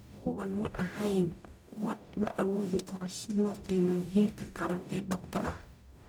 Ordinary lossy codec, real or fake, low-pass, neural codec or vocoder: none; fake; none; codec, 44.1 kHz, 0.9 kbps, DAC